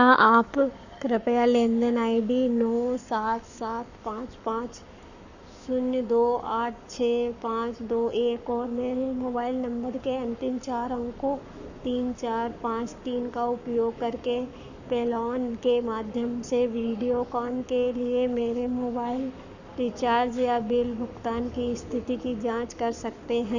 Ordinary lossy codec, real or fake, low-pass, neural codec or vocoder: none; fake; 7.2 kHz; codec, 44.1 kHz, 7.8 kbps, Pupu-Codec